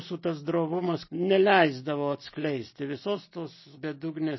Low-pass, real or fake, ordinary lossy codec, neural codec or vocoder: 7.2 kHz; real; MP3, 24 kbps; none